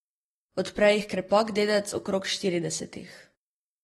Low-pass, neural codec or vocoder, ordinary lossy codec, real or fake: 19.8 kHz; none; AAC, 32 kbps; real